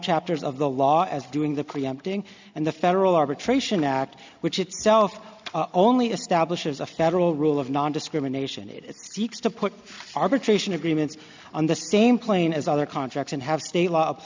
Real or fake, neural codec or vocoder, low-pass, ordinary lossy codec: real; none; 7.2 kHz; MP3, 64 kbps